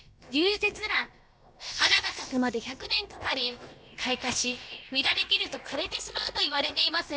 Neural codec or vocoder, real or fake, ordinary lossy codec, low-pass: codec, 16 kHz, about 1 kbps, DyCAST, with the encoder's durations; fake; none; none